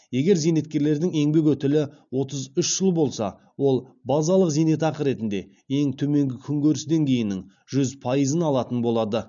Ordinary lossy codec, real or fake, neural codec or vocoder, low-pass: none; real; none; 7.2 kHz